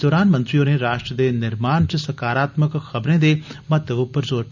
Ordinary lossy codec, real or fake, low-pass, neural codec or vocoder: none; real; 7.2 kHz; none